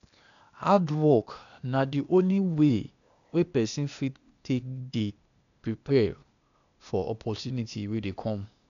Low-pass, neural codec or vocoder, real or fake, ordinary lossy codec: 7.2 kHz; codec, 16 kHz, 0.8 kbps, ZipCodec; fake; none